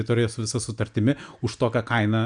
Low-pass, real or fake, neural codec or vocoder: 9.9 kHz; real; none